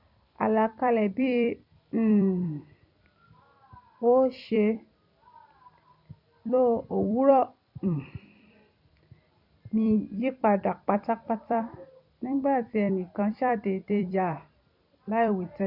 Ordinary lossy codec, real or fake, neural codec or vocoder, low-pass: none; fake; vocoder, 44.1 kHz, 128 mel bands every 256 samples, BigVGAN v2; 5.4 kHz